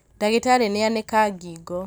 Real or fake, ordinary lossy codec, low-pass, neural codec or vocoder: real; none; none; none